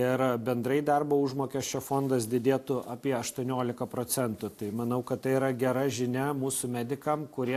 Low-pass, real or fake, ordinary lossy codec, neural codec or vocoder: 14.4 kHz; real; AAC, 64 kbps; none